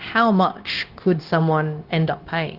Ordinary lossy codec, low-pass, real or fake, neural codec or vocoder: Opus, 16 kbps; 5.4 kHz; fake; codec, 16 kHz, 0.9 kbps, LongCat-Audio-Codec